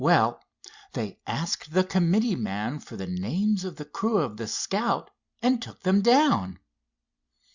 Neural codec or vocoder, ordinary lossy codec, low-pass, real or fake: none; Opus, 64 kbps; 7.2 kHz; real